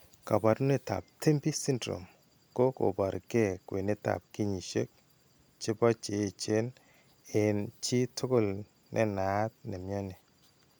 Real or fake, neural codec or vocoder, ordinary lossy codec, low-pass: real; none; none; none